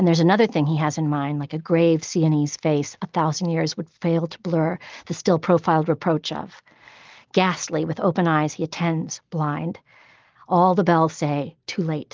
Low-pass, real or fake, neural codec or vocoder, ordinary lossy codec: 7.2 kHz; real; none; Opus, 32 kbps